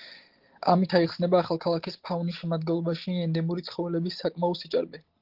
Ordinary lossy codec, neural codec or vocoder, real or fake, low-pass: Opus, 16 kbps; none; real; 5.4 kHz